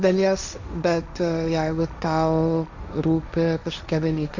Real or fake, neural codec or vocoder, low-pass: fake; codec, 16 kHz, 1.1 kbps, Voila-Tokenizer; 7.2 kHz